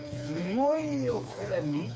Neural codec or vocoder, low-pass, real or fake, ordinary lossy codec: codec, 16 kHz, 4 kbps, FreqCodec, smaller model; none; fake; none